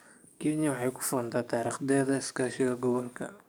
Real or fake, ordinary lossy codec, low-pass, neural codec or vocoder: fake; none; none; codec, 44.1 kHz, 7.8 kbps, DAC